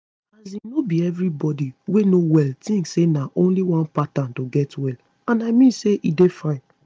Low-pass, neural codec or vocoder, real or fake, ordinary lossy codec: none; none; real; none